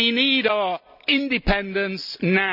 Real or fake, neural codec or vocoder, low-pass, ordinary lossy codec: real; none; 5.4 kHz; none